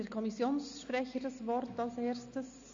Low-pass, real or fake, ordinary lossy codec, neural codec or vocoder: 7.2 kHz; real; AAC, 64 kbps; none